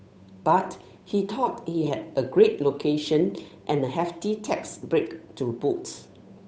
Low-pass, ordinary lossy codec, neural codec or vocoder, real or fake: none; none; codec, 16 kHz, 8 kbps, FunCodec, trained on Chinese and English, 25 frames a second; fake